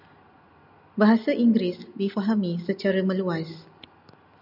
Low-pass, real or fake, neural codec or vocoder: 5.4 kHz; real; none